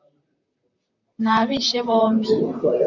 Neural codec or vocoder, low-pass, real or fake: vocoder, 44.1 kHz, 128 mel bands, Pupu-Vocoder; 7.2 kHz; fake